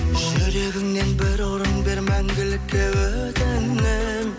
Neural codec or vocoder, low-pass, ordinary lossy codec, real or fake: none; none; none; real